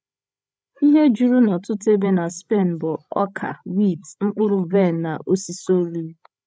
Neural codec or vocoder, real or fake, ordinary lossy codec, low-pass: codec, 16 kHz, 16 kbps, FreqCodec, larger model; fake; none; none